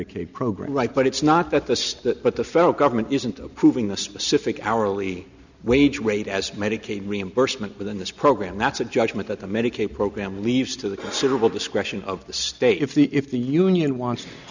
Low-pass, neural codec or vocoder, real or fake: 7.2 kHz; none; real